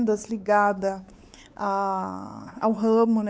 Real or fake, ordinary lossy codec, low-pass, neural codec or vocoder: fake; none; none; codec, 16 kHz, 4 kbps, X-Codec, WavLM features, trained on Multilingual LibriSpeech